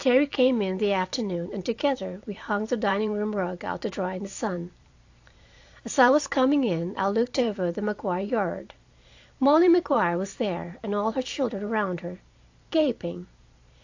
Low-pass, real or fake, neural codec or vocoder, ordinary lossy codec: 7.2 kHz; fake; vocoder, 44.1 kHz, 128 mel bands every 512 samples, BigVGAN v2; AAC, 48 kbps